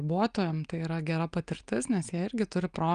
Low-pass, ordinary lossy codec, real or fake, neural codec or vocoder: 9.9 kHz; Opus, 32 kbps; real; none